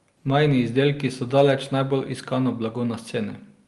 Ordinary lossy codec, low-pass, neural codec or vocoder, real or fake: Opus, 32 kbps; 10.8 kHz; none; real